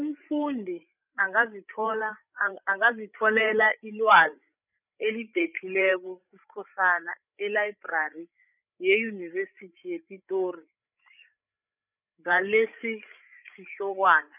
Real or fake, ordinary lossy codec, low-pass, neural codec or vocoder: fake; none; 3.6 kHz; codec, 16 kHz, 8 kbps, FreqCodec, larger model